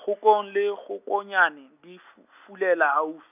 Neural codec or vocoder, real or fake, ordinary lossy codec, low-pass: none; real; none; 3.6 kHz